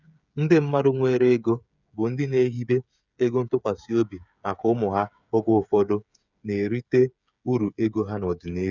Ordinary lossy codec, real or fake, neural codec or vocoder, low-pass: none; fake; codec, 16 kHz, 8 kbps, FreqCodec, smaller model; 7.2 kHz